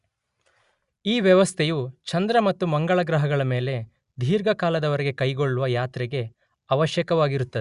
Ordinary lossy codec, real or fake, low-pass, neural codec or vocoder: Opus, 64 kbps; real; 10.8 kHz; none